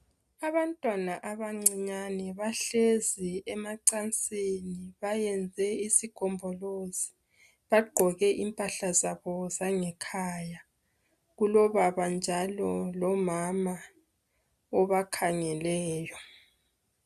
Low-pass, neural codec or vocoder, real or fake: 14.4 kHz; none; real